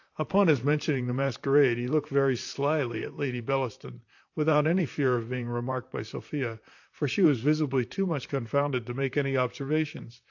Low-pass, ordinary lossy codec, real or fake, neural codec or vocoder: 7.2 kHz; AAC, 48 kbps; fake; vocoder, 44.1 kHz, 128 mel bands, Pupu-Vocoder